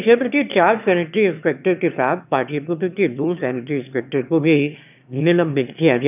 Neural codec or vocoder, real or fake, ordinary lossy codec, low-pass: autoencoder, 22.05 kHz, a latent of 192 numbers a frame, VITS, trained on one speaker; fake; none; 3.6 kHz